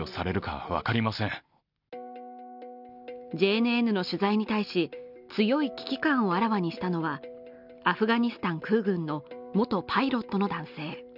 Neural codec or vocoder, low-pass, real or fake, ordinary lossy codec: none; 5.4 kHz; real; none